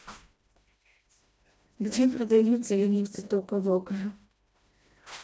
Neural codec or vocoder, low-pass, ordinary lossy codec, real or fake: codec, 16 kHz, 1 kbps, FreqCodec, smaller model; none; none; fake